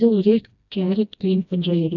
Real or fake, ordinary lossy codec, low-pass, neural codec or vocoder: fake; none; 7.2 kHz; codec, 16 kHz, 1 kbps, FreqCodec, smaller model